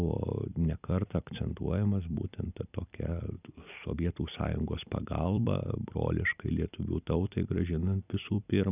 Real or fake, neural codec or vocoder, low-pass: fake; vocoder, 44.1 kHz, 128 mel bands every 256 samples, BigVGAN v2; 3.6 kHz